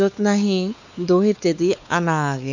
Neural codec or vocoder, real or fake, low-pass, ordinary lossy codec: codec, 16 kHz, 4 kbps, X-Codec, WavLM features, trained on Multilingual LibriSpeech; fake; 7.2 kHz; none